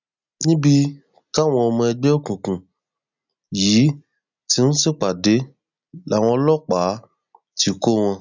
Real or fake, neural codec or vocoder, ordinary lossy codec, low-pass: real; none; none; 7.2 kHz